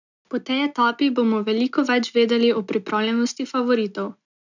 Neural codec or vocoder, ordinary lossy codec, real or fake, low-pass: none; none; real; 7.2 kHz